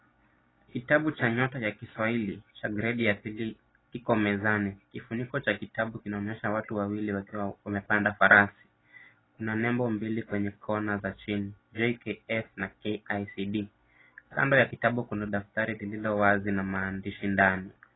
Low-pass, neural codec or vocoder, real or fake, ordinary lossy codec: 7.2 kHz; none; real; AAC, 16 kbps